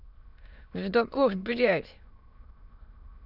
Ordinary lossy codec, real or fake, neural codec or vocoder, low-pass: none; fake; autoencoder, 22.05 kHz, a latent of 192 numbers a frame, VITS, trained on many speakers; 5.4 kHz